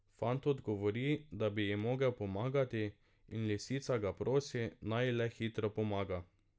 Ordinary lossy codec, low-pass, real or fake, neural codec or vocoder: none; none; real; none